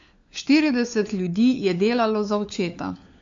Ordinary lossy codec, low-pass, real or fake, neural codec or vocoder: AAC, 64 kbps; 7.2 kHz; fake; codec, 16 kHz, 4 kbps, FunCodec, trained on LibriTTS, 50 frames a second